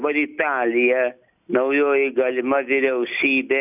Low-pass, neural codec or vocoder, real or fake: 3.6 kHz; none; real